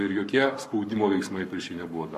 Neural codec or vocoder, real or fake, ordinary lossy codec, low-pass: codec, 44.1 kHz, 7.8 kbps, Pupu-Codec; fake; MP3, 64 kbps; 14.4 kHz